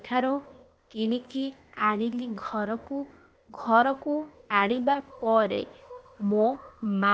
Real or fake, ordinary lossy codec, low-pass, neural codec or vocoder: fake; none; none; codec, 16 kHz, 0.8 kbps, ZipCodec